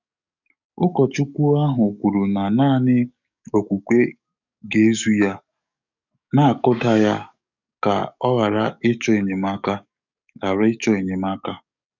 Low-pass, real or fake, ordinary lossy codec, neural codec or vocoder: 7.2 kHz; fake; none; codec, 44.1 kHz, 7.8 kbps, DAC